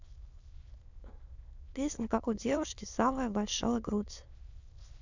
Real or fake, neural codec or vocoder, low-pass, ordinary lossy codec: fake; autoencoder, 22.05 kHz, a latent of 192 numbers a frame, VITS, trained on many speakers; 7.2 kHz; none